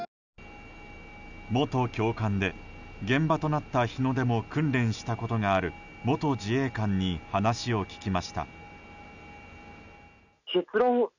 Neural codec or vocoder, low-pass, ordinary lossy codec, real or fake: none; 7.2 kHz; none; real